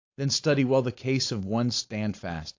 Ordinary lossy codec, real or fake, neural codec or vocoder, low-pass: AAC, 48 kbps; fake; codec, 16 kHz, 4.8 kbps, FACodec; 7.2 kHz